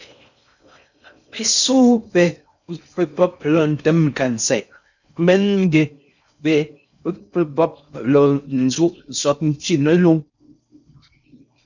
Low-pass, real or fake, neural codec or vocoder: 7.2 kHz; fake; codec, 16 kHz in and 24 kHz out, 0.6 kbps, FocalCodec, streaming, 4096 codes